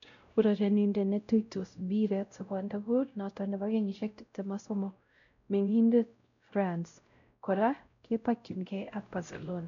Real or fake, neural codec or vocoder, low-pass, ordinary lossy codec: fake; codec, 16 kHz, 0.5 kbps, X-Codec, WavLM features, trained on Multilingual LibriSpeech; 7.2 kHz; MP3, 96 kbps